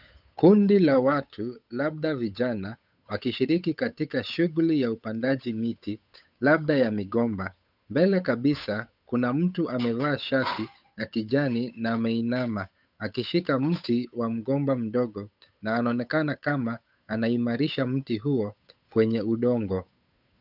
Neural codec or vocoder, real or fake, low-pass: codec, 16 kHz, 8 kbps, FunCodec, trained on Chinese and English, 25 frames a second; fake; 5.4 kHz